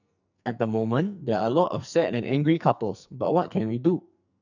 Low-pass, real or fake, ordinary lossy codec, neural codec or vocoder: 7.2 kHz; fake; none; codec, 44.1 kHz, 2.6 kbps, SNAC